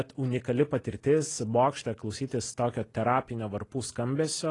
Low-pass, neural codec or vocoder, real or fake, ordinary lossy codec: 10.8 kHz; none; real; AAC, 32 kbps